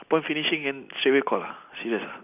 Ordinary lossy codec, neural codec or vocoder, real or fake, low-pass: none; none; real; 3.6 kHz